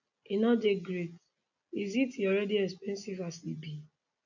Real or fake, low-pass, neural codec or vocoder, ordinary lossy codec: real; 7.2 kHz; none; none